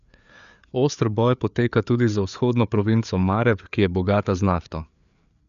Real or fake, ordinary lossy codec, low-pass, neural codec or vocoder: fake; none; 7.2 kHz; codec, 16 kHz, 4 kbps, FreqCodec, larger model